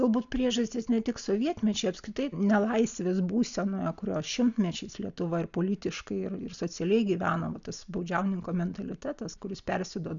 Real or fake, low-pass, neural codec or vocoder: real; 7.2 kHz; none